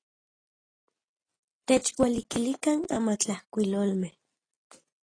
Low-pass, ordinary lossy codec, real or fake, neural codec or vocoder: 9.9 kHz; AAC, 32 kbps; real; none